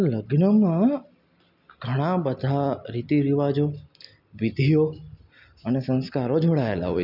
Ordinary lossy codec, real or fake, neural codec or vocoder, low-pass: none; real; none; 5.4 kHz